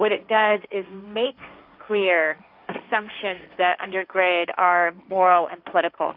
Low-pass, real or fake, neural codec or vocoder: 5.4 kHz; fake; codec, 16 kHz, 1.1 kbps, Voila-Tokenizer